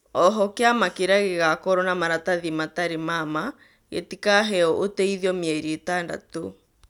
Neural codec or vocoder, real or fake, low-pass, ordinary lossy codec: none; real; 19.8 kHz; none